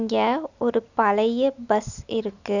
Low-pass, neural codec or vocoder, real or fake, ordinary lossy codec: 7.2 kHz; none; real; none